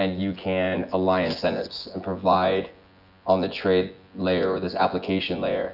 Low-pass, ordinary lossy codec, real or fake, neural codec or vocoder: 5.4 kHz; Opus, 64 kbps; fake; vocoder, 24 kHz, 100 mel bands, Vocos